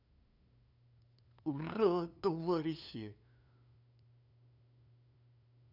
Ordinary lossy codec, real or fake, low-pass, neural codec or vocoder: none; fake; 5.4 kHz; codec, 16 kHz, 2 kbps, FunCodec, trained on LibriTTS, 25 frames a second